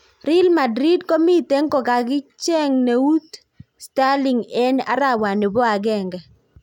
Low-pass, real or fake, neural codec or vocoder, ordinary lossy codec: 19.8 kHz; real; none; none